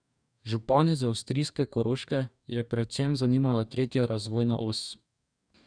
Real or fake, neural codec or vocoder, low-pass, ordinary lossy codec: fake; codec, 44.1 kHz, 2.6 kbps, DAC; 9.9 kHz; none